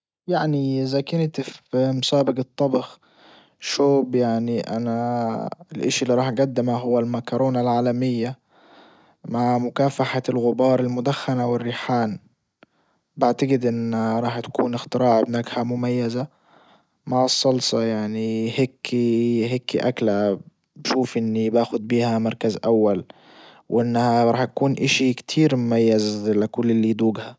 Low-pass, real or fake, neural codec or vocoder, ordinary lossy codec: none; real; none; none